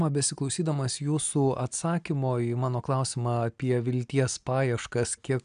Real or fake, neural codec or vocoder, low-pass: real; none; 9.9 kHz